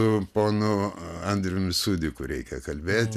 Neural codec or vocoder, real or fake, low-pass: none; real; 14.4 kHz